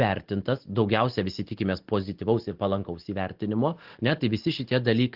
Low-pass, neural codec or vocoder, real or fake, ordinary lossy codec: 5.4 kHz; none; real; Opus, 16 kbps